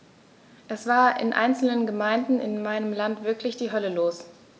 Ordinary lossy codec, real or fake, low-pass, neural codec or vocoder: none; real; none; none